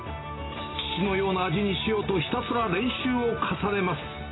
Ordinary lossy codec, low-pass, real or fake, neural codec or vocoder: AAC, 16 kbps; 7.2 kHz; real; none